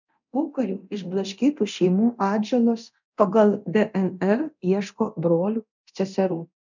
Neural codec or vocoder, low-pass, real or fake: codec, 24 kHz, 0.9 kbps, DualCodec; 7.2 kHz; fake